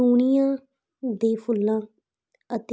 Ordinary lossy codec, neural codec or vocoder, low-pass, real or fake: none; none; none; real